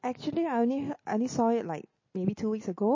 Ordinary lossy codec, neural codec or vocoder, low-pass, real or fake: MP3, 32 kbps; none; 7.2 kHz; real